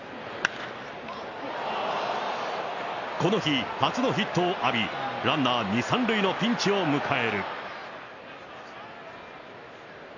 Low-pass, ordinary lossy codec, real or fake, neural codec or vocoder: 7.2 kHz; none; real; none